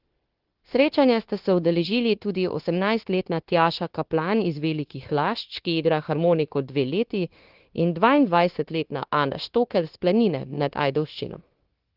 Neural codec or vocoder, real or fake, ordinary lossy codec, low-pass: codec, 16 kHz, 0.9 kbps, LongCat-Audio-Codec; fake; Opus, 16 kbps; 5.4 kHz